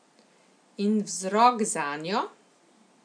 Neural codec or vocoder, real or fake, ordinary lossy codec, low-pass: none; real; none; 9.9 kHz